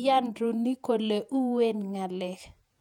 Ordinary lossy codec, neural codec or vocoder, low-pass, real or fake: none; vocoder, 48 kHz, 128 mel bands, Vocos; 19.8 kHz; fake